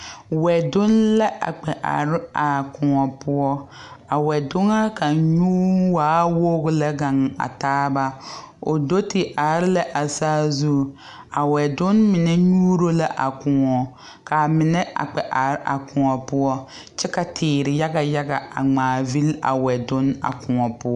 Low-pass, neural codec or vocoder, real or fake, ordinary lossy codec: 10.8 kHz; none; real; MP3, 96 kbps